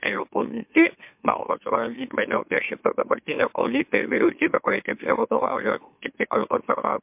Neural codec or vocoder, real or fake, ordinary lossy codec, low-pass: autoencoder, 44.1 kHz, a latent of 192 numbers a frame, MeloTTS; fake; MP3, 32 kbps; 3.6 kHz